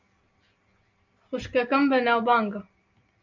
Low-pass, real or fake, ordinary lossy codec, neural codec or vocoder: 7.2 kHz; real; MP3, 48 kbps; none